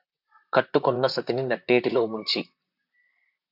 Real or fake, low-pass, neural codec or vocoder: fake; 5.4 kHz; vocoder, 44.1 kHz, 128 mel bands, Pupu-Vocoder